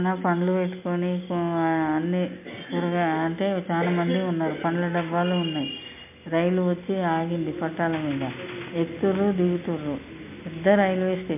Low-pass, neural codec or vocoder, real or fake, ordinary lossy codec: 3.6 kHz; none; real; MP3, 32 kbps